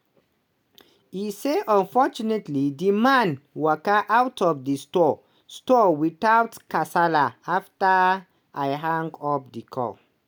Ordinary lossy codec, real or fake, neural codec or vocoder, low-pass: none; real; none; none